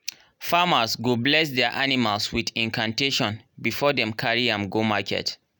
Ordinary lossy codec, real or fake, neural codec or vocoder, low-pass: none; real; none; none